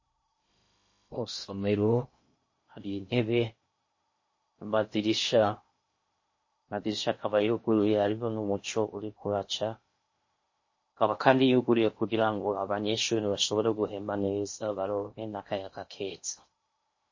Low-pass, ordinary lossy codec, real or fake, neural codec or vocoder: 7.2 kHz; MP3, 32 kbps; fake; codec, 16 kHz in and 24 kHz out, 0.6 kbps, FocalCodec, streaming, 2048 codes